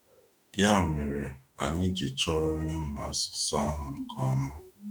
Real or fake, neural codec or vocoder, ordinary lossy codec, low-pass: fake; autoencoder, 48 kHz, 32 numbers a frame, DAC-VAE, trained on Japanese speech; none; none